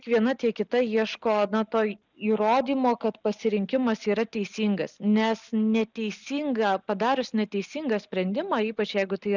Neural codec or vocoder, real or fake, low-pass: none; real; 7.2 kHz